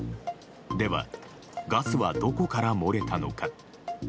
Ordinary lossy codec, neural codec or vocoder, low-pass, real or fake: none; none; none; real